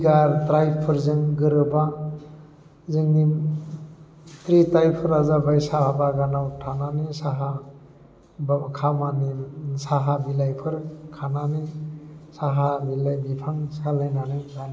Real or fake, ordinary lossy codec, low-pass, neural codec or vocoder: real; none; none; none